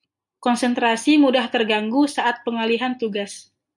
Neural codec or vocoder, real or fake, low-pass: none; real; 10.8 kHz